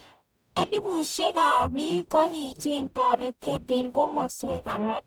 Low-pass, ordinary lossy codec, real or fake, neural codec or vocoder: none; none; fake; codec, 44.1 kHz, 0.9 kbps, DAC